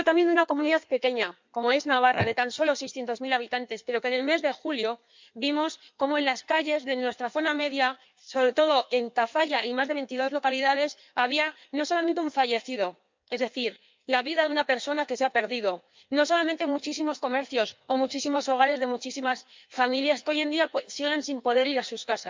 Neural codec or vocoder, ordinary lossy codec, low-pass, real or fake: codec, 16 kHz in and 24 kHz out, 1.1 kbps, FireRedTTS-2 codec; MP3, 64 kbps; 7.2 kHz; fake